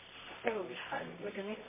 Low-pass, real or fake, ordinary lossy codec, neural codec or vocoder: 3.6 kHz; fake; MP3, 16 kbps; codec, 24 kHz, 0.9 kbps, WavTokenizer, medium music audio release